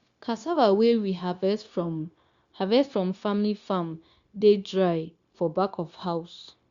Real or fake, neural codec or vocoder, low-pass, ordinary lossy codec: fake; codec, 16 kHz, 0.9 kbps, LongCat-Audio-Codec; 7.2 kHz; Opus, 64 kbps